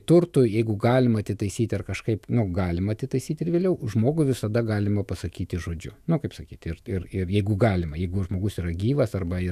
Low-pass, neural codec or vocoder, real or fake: 14.4 kHz; autoencoder, 48 kHz, 128 numbers a frame, DAC-VAE, trained on Japanese speech; fake